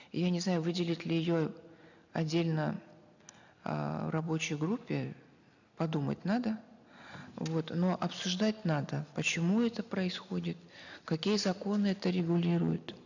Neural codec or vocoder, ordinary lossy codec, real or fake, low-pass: none; none; real; 7.2 kHz